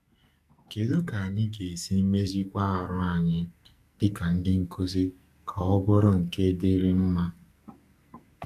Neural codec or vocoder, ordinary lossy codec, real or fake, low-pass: codec, 44.1 kHz, 2.6 kbps, SNAC; none; fake; 14.4 kHz